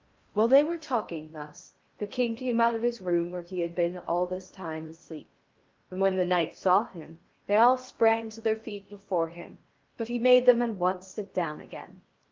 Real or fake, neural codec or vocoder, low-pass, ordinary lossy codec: fake; codec, 16 kHz in and 24 kHz out, 0.8 kbps, FocalCodec, streaming, 65536 codes; 7.2 kHz; Opus, 32 kbps